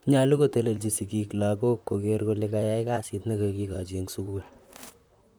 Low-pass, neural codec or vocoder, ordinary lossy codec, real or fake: none; vocoder, 44.1 kHz, 128 mel bands, Pupu-Vocoder; none; fake